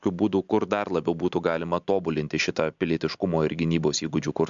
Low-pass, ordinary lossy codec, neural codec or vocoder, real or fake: 7.2 kHz; MP3, 64 kbps; none; real